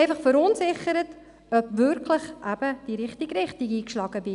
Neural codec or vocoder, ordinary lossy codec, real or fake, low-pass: none; none; real; 10.8 kHz